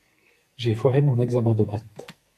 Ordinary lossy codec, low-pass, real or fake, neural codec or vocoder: AAC, 64 kbps; 14.4 kHz; fake; codec, 44.1 kHz, 2.6 kbps, SNAC